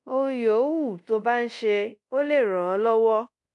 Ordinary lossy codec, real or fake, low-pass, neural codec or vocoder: none; fake; 10.8 kHz; codec, 24 kHz, 0.5 kbps, DualCodec